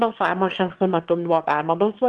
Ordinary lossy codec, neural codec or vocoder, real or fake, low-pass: Opus, 16 kbps; autoencoder, 22.05 kHz, a latent of 192 numbers a frame, VITS, trained on one speaker; fake; 9.9 kHz